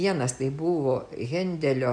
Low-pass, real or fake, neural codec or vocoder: 9.9 kHz; real; none